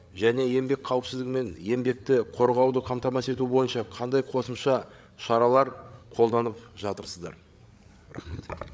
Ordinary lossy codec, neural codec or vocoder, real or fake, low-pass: none; codec, 16 kHz, 8 kbps, FreqCodec, larger model; fake; none